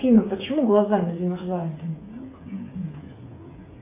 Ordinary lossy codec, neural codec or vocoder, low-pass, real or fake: AAC, 24 kbps; codec, 16 kHz, 8 kbps, FreqCodec, smaller model; 3.6 kHz; fake